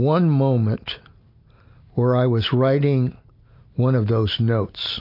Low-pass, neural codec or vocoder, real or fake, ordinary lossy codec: 5.4 kHz; none; real; MP3, 32 kbps